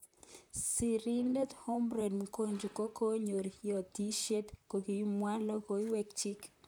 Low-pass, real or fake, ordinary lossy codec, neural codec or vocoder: none; fake; none; vocoder, 44.1 kHz, 128 mel bands, Pupu-Vocoder